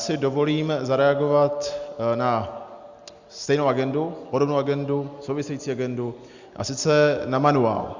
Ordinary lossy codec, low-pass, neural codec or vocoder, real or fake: Opus, 64 kbps; 7.2 kHz; none; real